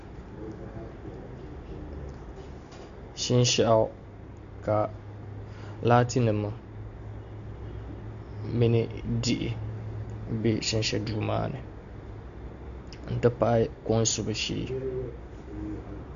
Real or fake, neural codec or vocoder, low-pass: real; none; 7.2 kHz